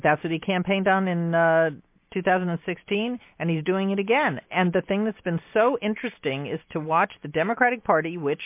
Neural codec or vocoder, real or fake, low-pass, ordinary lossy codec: none; real; 3.6 kHz; MP3, 24 kbps